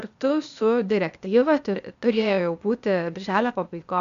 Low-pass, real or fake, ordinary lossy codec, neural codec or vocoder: 7.2 kHz; fake; MP3, 96 kbps; codec, 16 kHz, 0.8 kbps, ZipCodec